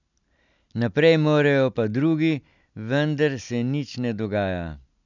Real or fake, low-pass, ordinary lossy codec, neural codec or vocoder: real; 7.2 kHz; none; none